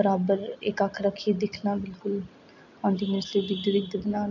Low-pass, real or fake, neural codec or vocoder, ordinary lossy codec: 7.2 kHz; real; none; none